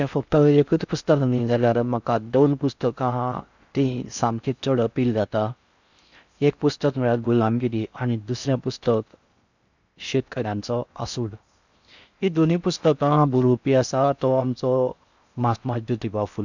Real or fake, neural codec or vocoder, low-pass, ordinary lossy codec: fake; codec, 16 kHz in and 24 kHz out, 0.6 kbps, FocalCodec, streaming, 4096 codes; 7.2 kHz; none